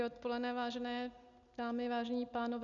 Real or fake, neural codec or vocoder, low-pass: real; none; 7.2 kHz